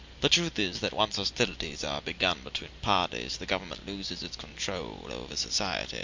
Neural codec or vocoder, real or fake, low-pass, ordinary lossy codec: none; real; 7.2 kHz; MP3, 64 kbps